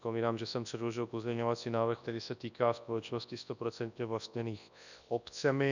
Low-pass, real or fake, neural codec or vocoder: 7.2 kHz; fake; codec, 24 kHz, 0.9 kbps, WavTokenizer, large speech release